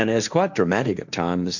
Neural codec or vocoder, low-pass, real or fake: codec, 16 kHz, 1.1 kbps, Voila-Tokenizer; 7.2 kHz; fake